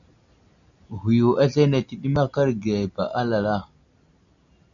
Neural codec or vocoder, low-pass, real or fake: none; 7.2 kHz; real